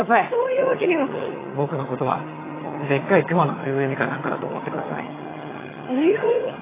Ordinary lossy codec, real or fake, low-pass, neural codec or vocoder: AAC, 16 kbps; fake; 3.6 kHz; vocoder, 22.05 kHz, 80 mel bands, HiFi-GAN